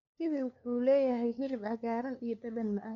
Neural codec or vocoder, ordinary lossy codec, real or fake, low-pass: codec, 16 kHz, 2 kbps, FunCodec, trained on LibriTTS, 25 frames a second; none; fake; 7.2 kHz